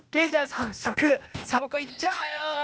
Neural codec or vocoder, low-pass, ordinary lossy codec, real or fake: codec, 16 kHz, 0.8 kbps, ZipCodec; none; none; fake